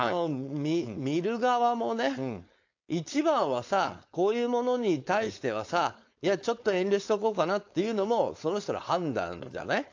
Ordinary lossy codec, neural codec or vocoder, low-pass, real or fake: AAC, 48 kbps; codec, 16 kHz, 4.8 kbps, FACodec; 7.2 kHz; fake